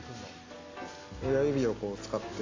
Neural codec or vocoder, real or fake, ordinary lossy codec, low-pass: none; real; AAC, 32 kbps; 7.2 kHz